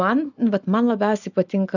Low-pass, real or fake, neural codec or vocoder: 7.2 kHz; real; none